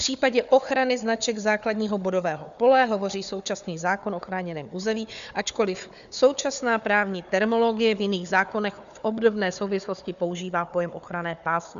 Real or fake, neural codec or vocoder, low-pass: fake; codec, 16 kHz, 4 kbps, FunCodec, trained on Chinese and English, 50 frames a second; 7.2 kHz